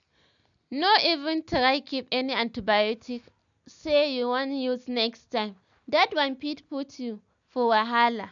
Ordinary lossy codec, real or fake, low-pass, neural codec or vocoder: none; real; 7.2 kHz; none